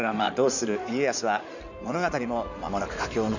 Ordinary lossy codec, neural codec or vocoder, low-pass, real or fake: none; codec, 16 kHz in and 24 kHz out, 2.2 kbps, FireRedTTS-2 codec; 7.2 kHz; fake